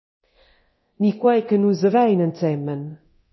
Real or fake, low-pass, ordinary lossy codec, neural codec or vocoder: fake; 7.2 kHz; MP3, 24 kbps; codec, 24 kHz, 0.9 kbps, DualCodec